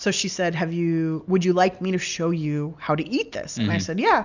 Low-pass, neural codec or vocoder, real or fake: 7.2 kHz; none; real